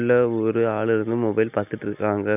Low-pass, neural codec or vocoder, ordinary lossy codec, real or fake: 3.6 kHz; none; none; real